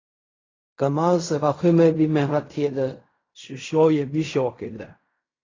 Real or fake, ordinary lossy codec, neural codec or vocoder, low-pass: fake; AAC, 32 kbps; codec, 16 kHz in and 24 kHz out, 0.4 kbps, LongCat-Audio-Codec, fine tuned four codebook decoder; 7.2 kHz